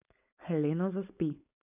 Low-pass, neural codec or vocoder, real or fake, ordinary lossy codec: 3.6 kHz; codec, 16 kHz, 4.8 kbps, FACodec; fake; none